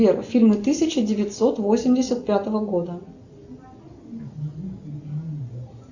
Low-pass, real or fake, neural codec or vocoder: 7.2 kHz; real; none